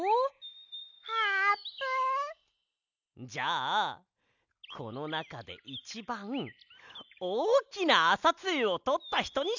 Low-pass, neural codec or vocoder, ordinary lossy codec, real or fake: 7.2 kHz; none; none; real